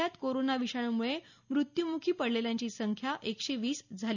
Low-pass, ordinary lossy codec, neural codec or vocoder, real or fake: 7.2 kHz; none; none; real